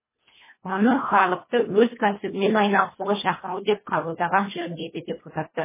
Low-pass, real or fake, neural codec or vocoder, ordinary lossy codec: 3.6 kHz; fake; codec, 24 kHz, 1.5 kbps, HILCodec; MP3, 16 kbps